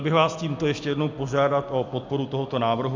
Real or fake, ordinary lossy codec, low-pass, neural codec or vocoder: real; MP3, 64 kbps; 7.2 kHz; none